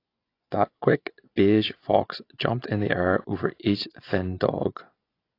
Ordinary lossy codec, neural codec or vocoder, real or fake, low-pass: AAC, 32 kbps; vocoder, 24 kHz, 100 mel bands, Vocos; fake; 5.4 kHz